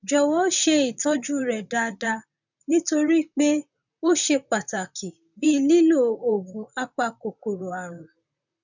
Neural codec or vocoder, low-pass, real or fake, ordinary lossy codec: vocoder, 44.1 kHz, 128 mel bands, Pupu-Vocoder; 7.2 kHz; fake; none